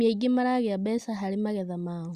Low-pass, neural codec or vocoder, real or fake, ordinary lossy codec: 14.4 kHz; none; real; Opus, 64 kbps